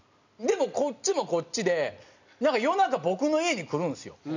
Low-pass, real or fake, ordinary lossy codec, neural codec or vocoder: 7.2 kHz; real; none; none